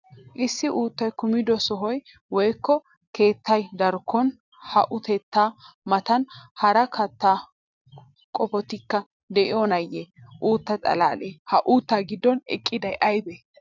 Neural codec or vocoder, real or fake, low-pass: none; real; 7.2 kHz